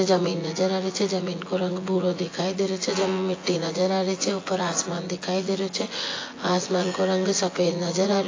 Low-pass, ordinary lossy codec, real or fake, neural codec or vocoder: 7.2 kHz; AAC, 32 kbps; fake; vocoder, 24 kHz, 100 mel bands, Vocos